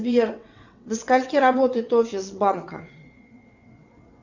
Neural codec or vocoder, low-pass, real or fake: vocoder, 22.05 kHz, 80 mel bands, WaveNeXt; 7.2 kHz; fake